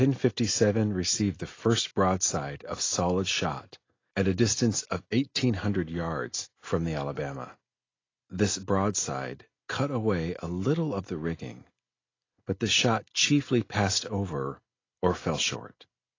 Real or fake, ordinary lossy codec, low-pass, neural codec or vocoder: real; AAC, 32 kbps; 7.2 kHz; none